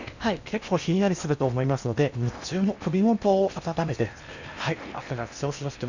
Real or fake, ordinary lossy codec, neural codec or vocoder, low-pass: fake; none; codec, 16 kHz in and 24 kHz out, 0.8 kbps, FocalCodec, streaming, 65536 codes; 7.2 kHz